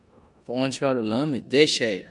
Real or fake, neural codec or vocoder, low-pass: fake; codec, 16 kHz in and 24 kHz out, 0.9 kbps, LongCat-Audio-Codec, four codebook decoder; 10.8 kHz